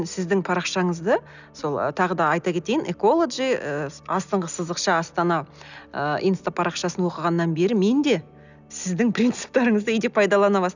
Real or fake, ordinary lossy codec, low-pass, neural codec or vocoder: real; none; 7.2 kHz; none